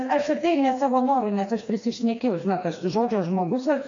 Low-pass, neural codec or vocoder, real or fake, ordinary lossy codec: 7.2 kHz; codec, 16 kHz, 2 kbps, FreqCodec, smaller model; fake; AAC, 48 kbps